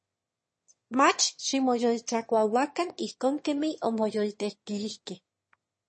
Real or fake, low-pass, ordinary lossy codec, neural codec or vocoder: fake; 9.9 kHz; MP3, 32 kbps; autoencoder, 22.05 kHz, a latent of 192 numbers a frame, VITS, trained on one speaker